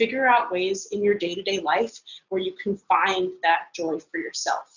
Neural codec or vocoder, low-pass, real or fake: none; 7.2 kHz; real